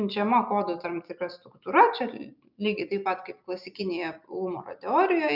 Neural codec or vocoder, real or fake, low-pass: none; real; 5.4 kHz